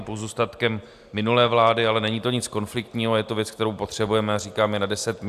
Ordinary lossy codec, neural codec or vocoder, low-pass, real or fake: AAC, 96 kbps; none; 14.4 kHz; real